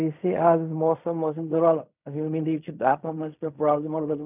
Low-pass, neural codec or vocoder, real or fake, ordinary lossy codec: 3.6 kHz; codec, 16 kHz in and 24 kHz out, 0.4 kbps, LongCat-Audio-Codec, fine tuned four codebook decoder; fake; none